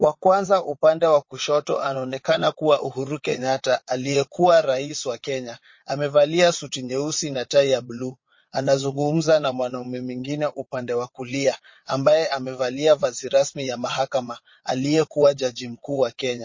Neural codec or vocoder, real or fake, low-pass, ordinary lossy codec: vocoder, 22.05 kHz, 80 mel bands, WaveNeXt; fake; 7.2 kHz; MP3, 32 kbps